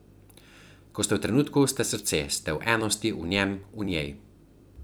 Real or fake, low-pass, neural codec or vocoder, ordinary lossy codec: fake; none; vocoder, 44.1 kHz, 128 mel bands every 256 samples, BigVGAN v2; none